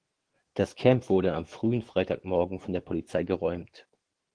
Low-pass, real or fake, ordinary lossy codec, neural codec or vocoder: 9.9 kHz; fake; Opus, 16 kbps; autoencoder, 48 kHz, 128 numbers a frame, DAC-VAE, trained on Japanese speech